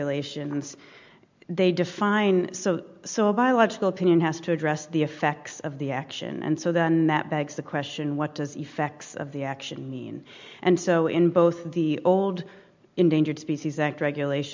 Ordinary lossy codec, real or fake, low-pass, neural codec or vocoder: MP3, 64 kbps; real; 7.2 kHz; none